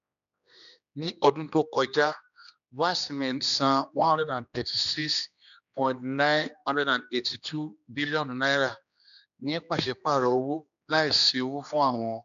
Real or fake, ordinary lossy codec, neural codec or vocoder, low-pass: fake; none; codec, 16 kHz, 2 kbps, X-Codec, HuBERT features, trained on general audio; 7.2 kHz